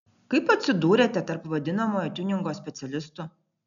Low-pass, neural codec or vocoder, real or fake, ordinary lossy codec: 7.2 kHz; none; real; MP3, 96 kbps